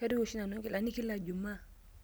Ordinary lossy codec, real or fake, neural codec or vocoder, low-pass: none; real; none; none